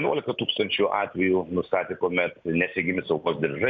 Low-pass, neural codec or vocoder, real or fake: 7.2 kHz; none; real